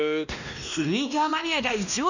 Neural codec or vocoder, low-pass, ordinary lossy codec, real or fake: codec, 16 kHz, 1 kbps, X-Codec, WavLM features, trained on Multilingual LibriSpeech; 7.2 kHz; none; fake